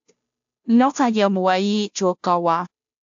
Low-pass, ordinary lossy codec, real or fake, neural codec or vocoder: 7.2 kHz; AAC, 64 kbps; fake; codec, 16 kHz, 0.5 kbps, FunCodec, trained on Chinese and English, 25 frames a second